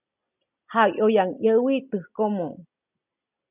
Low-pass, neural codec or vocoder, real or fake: 3.6 kHz; none; real